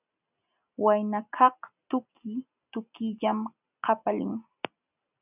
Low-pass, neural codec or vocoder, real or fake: 3.6 kHz; none; real